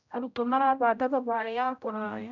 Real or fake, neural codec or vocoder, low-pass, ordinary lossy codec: fake; codec, 16 kHz, 0.5 kbps, X-Codec, HuBERT features, trained on general audio; 7.2 kHz; none